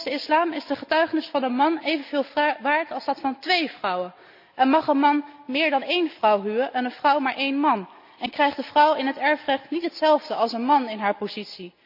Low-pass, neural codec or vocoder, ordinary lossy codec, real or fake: 5.4 kHz; none; none; real